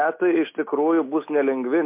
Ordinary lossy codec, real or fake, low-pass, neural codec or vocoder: MP3, 24 kbps; real; 3.6 kHz; none